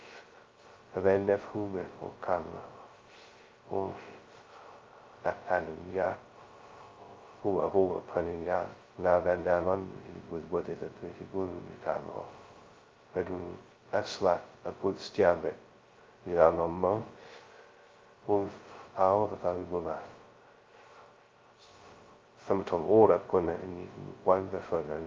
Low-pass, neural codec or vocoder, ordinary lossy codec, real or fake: 7.2 kHz; codec, 16 kHz, 0.2 kbps, FocalCodec; Opus, 24 kbps; fake